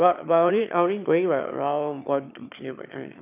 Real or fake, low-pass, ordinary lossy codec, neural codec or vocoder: fake; 3.6 kHz; none; autoencoder, 22.05 kHz, a latent of 192 numbers a frame, VITS, trained on one speaker